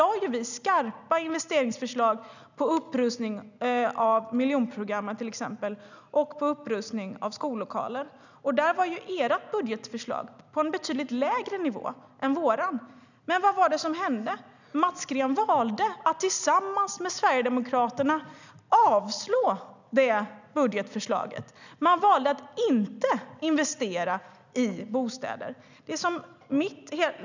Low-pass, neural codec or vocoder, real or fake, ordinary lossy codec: 7.2 kHz; none; real; none